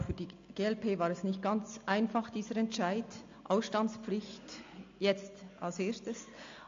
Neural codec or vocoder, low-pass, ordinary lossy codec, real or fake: none; 7.2 kHz; AAC, 48 kbps; real